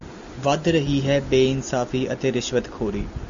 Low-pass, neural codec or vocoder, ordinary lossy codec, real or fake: 7.2 kHz; none; MP3, 64 kbps; real